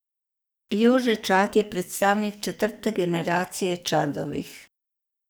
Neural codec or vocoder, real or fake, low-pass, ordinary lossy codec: codec, 44.1 kHz, 2.6 kbps, SNAC; fake; none; none